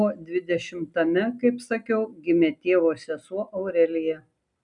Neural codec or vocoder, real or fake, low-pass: none; real; 10.8 kHz